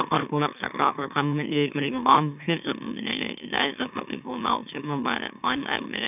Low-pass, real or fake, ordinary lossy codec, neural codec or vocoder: 3.6 kHz; fake; none; autoencoder, 44.1 kHz, a latent of 192 numbers a frame, MeloTTS